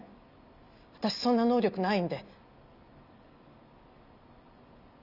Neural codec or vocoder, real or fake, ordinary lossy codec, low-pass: none; real; none; 5.4 kHz